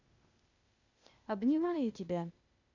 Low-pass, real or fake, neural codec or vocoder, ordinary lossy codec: 7.2 kHz; fake; codec, 16 kHz, 0.8 kbps, ZipCodec; none